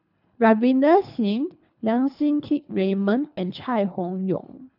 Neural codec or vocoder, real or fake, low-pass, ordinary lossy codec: codec, 24 kHz, 3 kbps, HILCodec; fake; 5.4 kHz; none